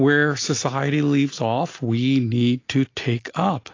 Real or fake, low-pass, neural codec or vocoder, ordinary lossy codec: real; 7.2 kHz; none; AAC, 32 kbps